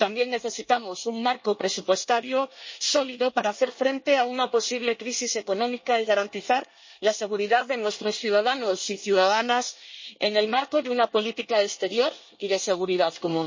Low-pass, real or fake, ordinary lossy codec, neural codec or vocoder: 7.2 kHz; fake; MP3, 32 kbps; codec, 24 kHz, 1 kbps, SNAC